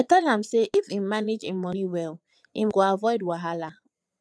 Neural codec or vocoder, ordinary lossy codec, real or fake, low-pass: vocoder, 22.05 kHz, 80 mel bands, Vocos; none; fake; none